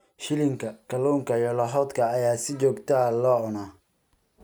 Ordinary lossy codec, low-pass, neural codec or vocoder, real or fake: none; none; none; real